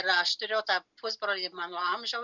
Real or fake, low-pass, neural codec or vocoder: real; 7.2 kHz; none